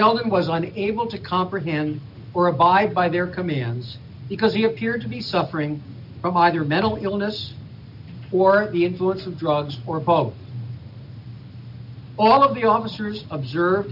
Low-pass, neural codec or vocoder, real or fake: 5.4 kHz; none; real